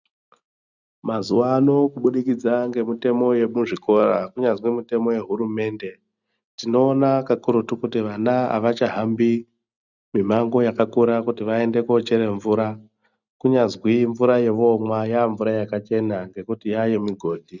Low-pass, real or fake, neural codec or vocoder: 7.2 kHz; real; none